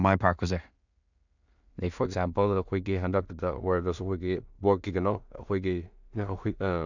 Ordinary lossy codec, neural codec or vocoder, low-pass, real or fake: none; codec, 16 kHz in and 24 kHz out, 0.4 kbps, LongCat-Audio-Codec, two codebook decoder; 7.2 kHz; fake